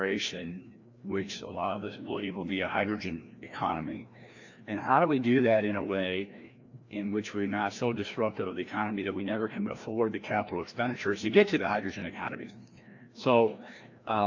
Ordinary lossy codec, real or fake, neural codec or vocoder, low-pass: AAC, 48 kbps; fake; codec, 16 kHz, 1 kbps, FreqCodec, larger model; 7.2 kHz